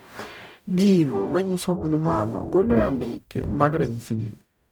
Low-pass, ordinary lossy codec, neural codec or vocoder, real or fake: none; none; codec, 44.1 kHz, 0.9 kbps, DAC; fake